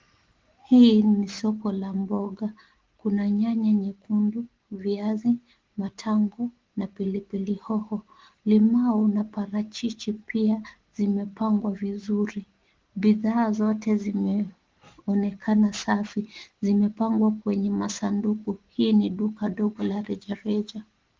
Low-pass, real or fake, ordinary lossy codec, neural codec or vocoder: 7.2 kHz; real; Opus, 16 kbps; none